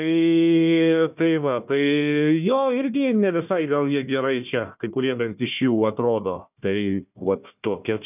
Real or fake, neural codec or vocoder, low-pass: fake; codec, 16 kHz, 1 kbps, FunCodec, trained on Chinese and English, 50 frames a second; 3.6 kHz